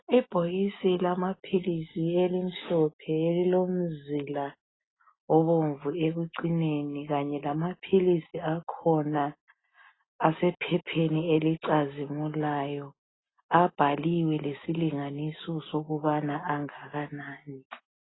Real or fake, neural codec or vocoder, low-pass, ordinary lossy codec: real; none; 7.2 kHz; AAC, 16 kbps